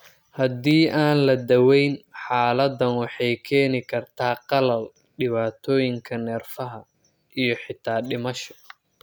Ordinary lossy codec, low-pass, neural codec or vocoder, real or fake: none; none; none; real